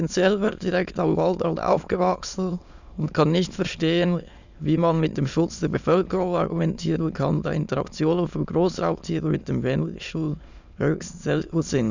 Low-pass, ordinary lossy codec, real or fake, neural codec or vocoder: 7.2 kHz; none; fake; autoencoder, 22.05 kHz, a latent of 192 numbers a frame, VITS, trained on many speakers